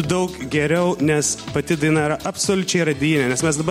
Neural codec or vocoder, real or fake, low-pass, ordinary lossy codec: none; real; 19.8 kHz; MP3, 64 kbps